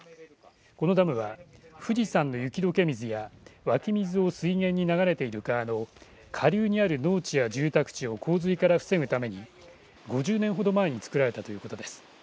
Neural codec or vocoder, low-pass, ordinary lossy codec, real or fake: none; none; none; real